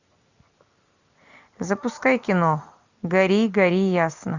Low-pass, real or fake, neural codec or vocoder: 7.2 kHz; real; none